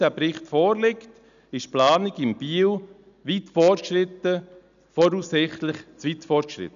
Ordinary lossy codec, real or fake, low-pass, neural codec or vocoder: none; real; 7.2 kHz; none